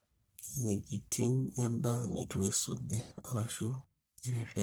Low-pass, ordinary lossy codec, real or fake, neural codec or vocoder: none; none; fake; codec, 44.1 kHz, 1.7 kbps, Pupu-Codec